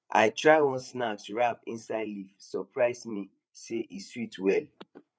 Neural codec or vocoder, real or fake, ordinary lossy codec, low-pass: codec, 16 kHz, 8 kbps, FreqCodec, larger model; fake; none; none